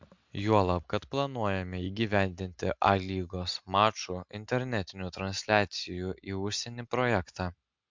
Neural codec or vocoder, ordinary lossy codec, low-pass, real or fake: none; MP3, 64 kbps; 7.2 kHz; real